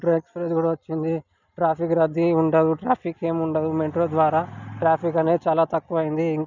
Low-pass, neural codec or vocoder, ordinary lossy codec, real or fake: 7.2 kHz; none; none; real